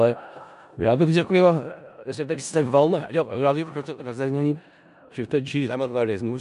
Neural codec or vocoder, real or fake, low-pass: codec, 16 kHz in and 24 kHz out, 0.4 kbps, LongCat-Audio-Codec, four codebook decoder; fake; 10.8 kHz